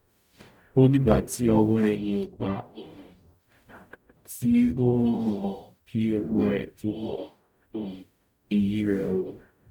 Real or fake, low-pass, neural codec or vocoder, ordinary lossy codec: fake; 19.8 kHz; codec, 44.1 kHz, 0.9 kbps, DAC; none